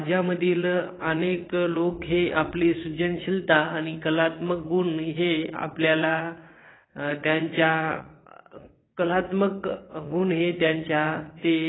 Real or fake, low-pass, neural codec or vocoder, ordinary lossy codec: fake; 7.2 kHz; vocoder, 22.05 kHz, 80 mel bands, WaveNeXt; AAC, 16 kbps